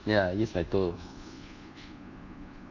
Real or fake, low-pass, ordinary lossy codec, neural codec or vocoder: fake; 7.2 kHz; none; codec, 24 kHz, 1.2 kbps, DualCodec